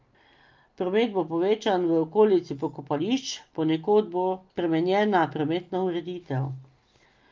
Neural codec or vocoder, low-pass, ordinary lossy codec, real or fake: none; 7.2 kHz; Opus, 24 kbps; real